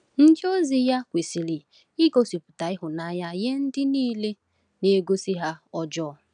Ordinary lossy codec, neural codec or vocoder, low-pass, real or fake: none; none; 9.9 kHz; real